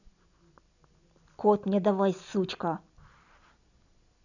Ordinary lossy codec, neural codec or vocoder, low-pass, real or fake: none; none; 7.2 kHz; real